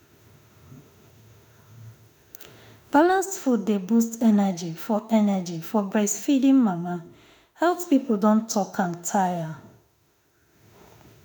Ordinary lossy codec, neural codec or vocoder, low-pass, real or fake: none; autoencoder, 48 kHz, 32 numbers a frame, DAC-VAE, trained on Japanese speech; none; fake